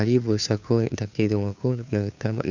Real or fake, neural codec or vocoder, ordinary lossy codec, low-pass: fake; codec, 16 kHz, 2 kbps, FunCodec, trained on Chinese and English, 25 frames a second; none; 7.2 kHz